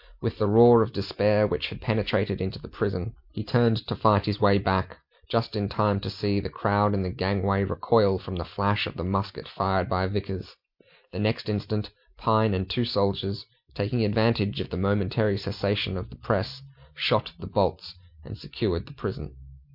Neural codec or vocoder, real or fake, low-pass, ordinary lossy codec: none; real; 5.4 kHz; MP3, 48 kbps